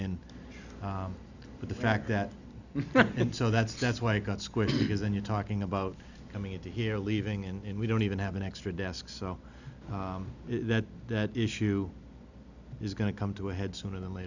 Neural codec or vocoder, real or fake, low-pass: none; real; 7.2 kHz